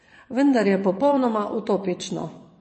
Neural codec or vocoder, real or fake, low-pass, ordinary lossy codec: vocoder, 22.05 kHz, 80 mel bands, WaveNeXt; fake; 9.9 kHz; MP3, 32 kbps